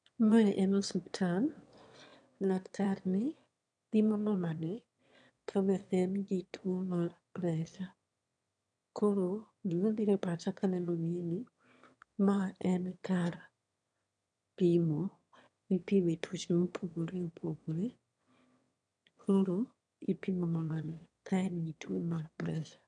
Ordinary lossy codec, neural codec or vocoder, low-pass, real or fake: none; autoencoder, 22.05 kHz, a latent of 192 numbers a frame, VITS, trained on one speaker; 9.9 kHz; fake